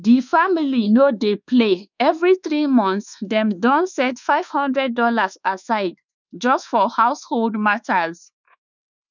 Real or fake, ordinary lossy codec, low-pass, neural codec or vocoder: fake; none; 7.2 kHz; codec, 24 kHz, 1.2 kbps, DualCodec